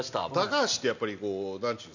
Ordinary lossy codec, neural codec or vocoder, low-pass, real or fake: none; none; 7.2 kHz; real